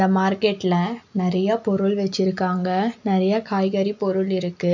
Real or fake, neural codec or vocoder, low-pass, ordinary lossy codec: real; none; 7.2 kHz; none